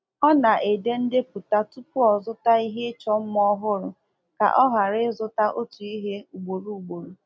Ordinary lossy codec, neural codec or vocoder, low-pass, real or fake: none; none; none; real